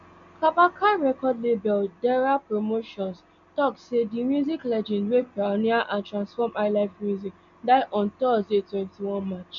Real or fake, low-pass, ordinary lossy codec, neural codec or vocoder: real; 7.2 kHz; none; none